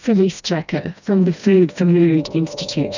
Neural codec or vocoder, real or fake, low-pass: codec, 16 kHz, 1 kbps, FreqCodec, smaller model; fake; 7.2 kHz